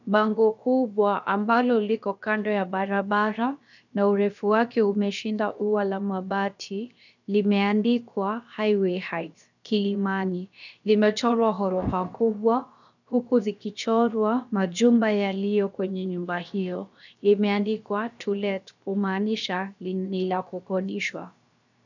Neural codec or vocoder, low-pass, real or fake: codec, 16 kHz, 0.7 kbps, FocalCodec; 7.2 kHz; fake